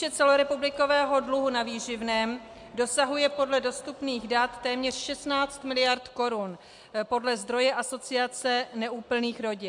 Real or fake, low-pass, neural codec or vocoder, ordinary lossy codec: real; 10.8 kHz; none; MP3, 64 kbps